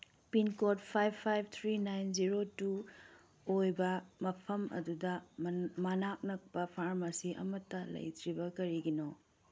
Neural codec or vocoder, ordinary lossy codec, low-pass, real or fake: none; none; none; real